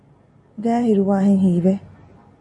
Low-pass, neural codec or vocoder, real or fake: 10.8 kHz; none; real